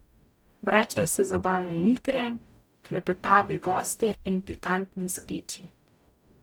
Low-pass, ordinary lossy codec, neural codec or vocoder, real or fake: none; none; codec, 44.1 kHz, 0.9 kbps, DAC; fake